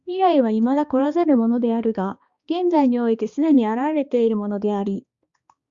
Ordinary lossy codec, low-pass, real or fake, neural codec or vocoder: Opus, 64 kbps; 7.2 kHz; fake; codec, 16 kHz, 2 kbps, X-Codec, HuBERT features, trained on balanced general audio